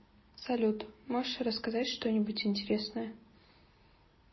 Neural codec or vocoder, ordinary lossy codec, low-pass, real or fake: none; MP3, 24 kbps; 7.2 kHz; real